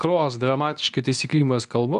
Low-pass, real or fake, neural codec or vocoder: 10.8 kHz; fake; codec, 24 kHz, 0.9 kbps, WavTokenizer, medium speech release version 2